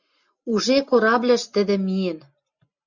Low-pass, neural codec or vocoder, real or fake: 7.2 kHz; none; real